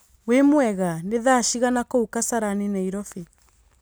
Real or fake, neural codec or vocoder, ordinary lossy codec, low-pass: real; none; none; none